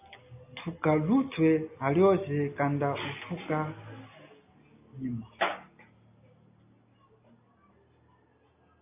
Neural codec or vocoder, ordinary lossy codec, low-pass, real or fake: none; AAC, 24 kbps; 3.6 kHz; real